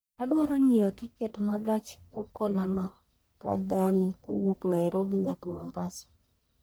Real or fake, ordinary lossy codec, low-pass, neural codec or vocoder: fake; none; none; codec, 44.1 kHz, 1.7 kbps, Pupu-Codec